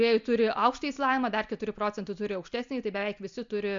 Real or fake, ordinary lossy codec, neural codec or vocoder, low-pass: real; MP3, 64 kbps; none; 7.2 kHz